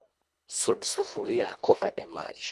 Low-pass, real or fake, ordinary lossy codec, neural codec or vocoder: none; fake; none; codec, 24 kHz, 1.5 kbps, HILCodec